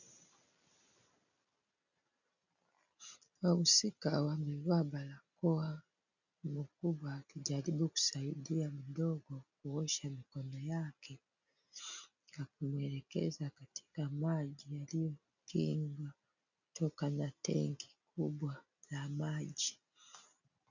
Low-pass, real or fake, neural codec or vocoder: 7.2 kHz; real; none